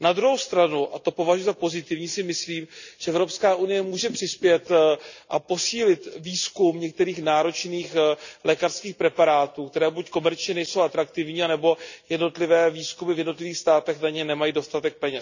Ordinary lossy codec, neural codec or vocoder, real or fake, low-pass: none; none; real; 7.2 kHz